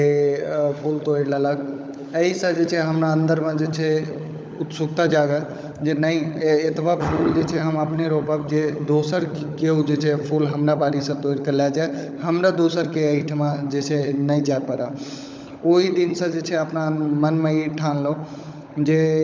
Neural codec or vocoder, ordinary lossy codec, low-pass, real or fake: codec, 16 kHz, 16 kbps, FunCodec, trained on LibriTTS, 50 frames a second; none; none; fake